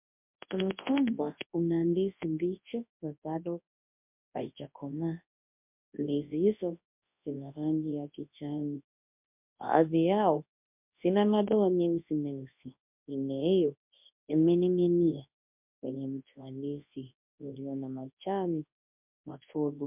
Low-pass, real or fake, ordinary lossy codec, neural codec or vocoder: 3.6 kHz; fake; MP3, 32 kbps; codec, 24 kHz, 0.9 kbps, WavTokenizer, large speech release